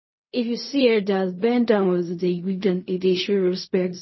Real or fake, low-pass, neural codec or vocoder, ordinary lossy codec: fake; 7.2 kHz; codec, 16 kHz in and 24 kHz out, 0.4 kbps, LongCat-Audio-Codec, fine tuned four codebook decoder; MP3, 24 kbps